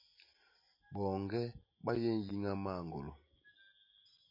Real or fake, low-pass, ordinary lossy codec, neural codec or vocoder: real; 5.4 kHz; MP3, 24 kbps; none